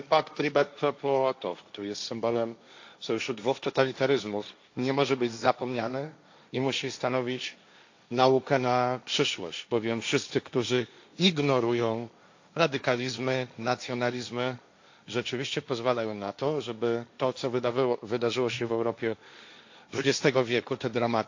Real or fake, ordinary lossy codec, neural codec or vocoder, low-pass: fake; AAC, 48 kbps; codec, 16 kHz, 1.1 kbps, Voila-Tokenizer; 7.2 kHz